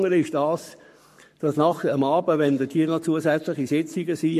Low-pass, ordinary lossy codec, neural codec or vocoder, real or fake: 14.4 kHz; MP3, 64 kbps; codec, 44.1 kHz, 7.8 kbps, Pupu-Codec; fake